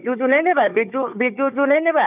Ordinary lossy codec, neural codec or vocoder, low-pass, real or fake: none; codec, 16 kHz, 4 kbps, FunCodec, trained on Chinese and English, 50 frames a second; 3.6 kHz; fake